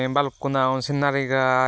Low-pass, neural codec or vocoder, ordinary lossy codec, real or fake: none; none; none; real